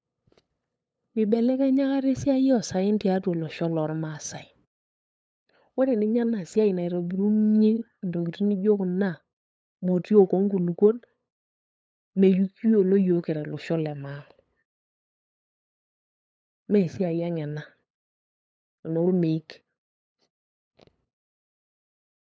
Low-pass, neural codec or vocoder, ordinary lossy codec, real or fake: none; codec, 16 kHz, 8 kbps, FunCodec, trained on LibriTTS, 25 frames a second; none; fake